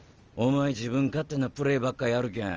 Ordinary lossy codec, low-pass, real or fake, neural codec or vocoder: Opus, 24 kbps; 7.2 kHz; real; none